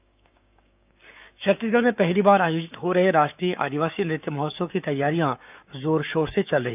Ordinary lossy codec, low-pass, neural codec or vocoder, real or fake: none; 3.6 kHz; codec, 16 kHz, 6 kbps, DAC; fake